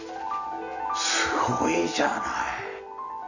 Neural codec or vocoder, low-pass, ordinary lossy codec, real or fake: none; 7.2 kHz; none; real